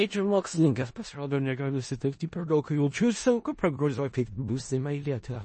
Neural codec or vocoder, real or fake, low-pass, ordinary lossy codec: codec, 16 kHz in and 24 kHz out, 0.4 kbps, LongCat-Audio-Codec, four codebook decoder; fake; 10.8 kHz; MP3, 32 kbps